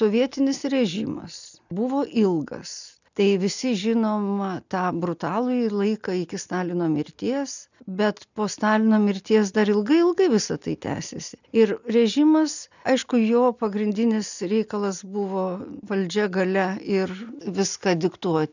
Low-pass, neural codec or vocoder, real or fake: 7.2 kHz; none; real